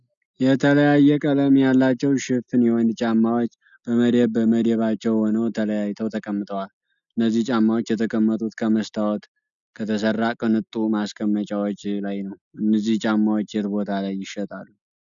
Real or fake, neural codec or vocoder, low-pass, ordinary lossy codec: real; none; 7.2 kHz; MP3, 96 kbps